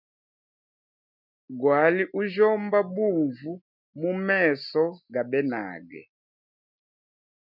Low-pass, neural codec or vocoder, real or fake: 5.4 kHz; none; real